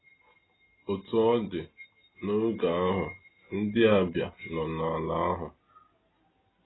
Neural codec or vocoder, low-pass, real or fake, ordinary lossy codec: none; 7.2 kHz; real; AAC, 16 kbps